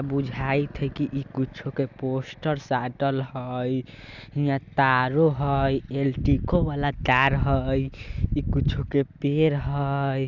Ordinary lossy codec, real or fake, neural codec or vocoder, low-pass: none; real; none; 7.2 kHz